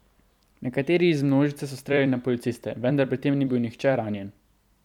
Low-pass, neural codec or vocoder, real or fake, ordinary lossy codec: 19.8 kHz; vocoder, 44.1 kHz, 128 mel bands every 256 samples, BigVGAN v2; fake; none